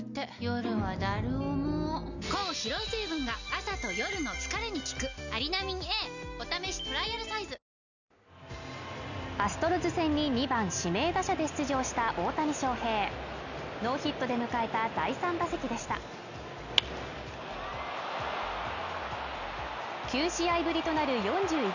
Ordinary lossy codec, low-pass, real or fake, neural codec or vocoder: none; 7.2 kHz; real; none